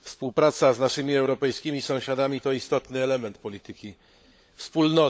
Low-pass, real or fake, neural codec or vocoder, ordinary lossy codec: none; fake; codec, 16 kHz, 16 kbps, FunCodec, trained on LibriTTS, 50 frames a second; none